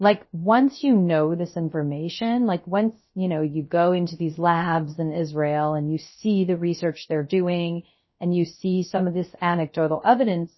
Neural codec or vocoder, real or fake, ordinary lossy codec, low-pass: codec, 16 kHz, 0.3 kbps, FocalCodec; fake; MP3, 24 kbps; 7.2 kHz